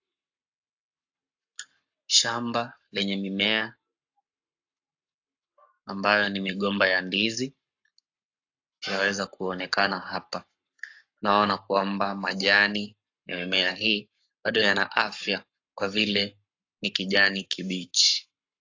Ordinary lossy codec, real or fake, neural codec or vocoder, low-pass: AAC, 48 kbps; fake; codec, 44.1 kHz, 7.8 kbps, Pupu-Codec; 7.2 kHz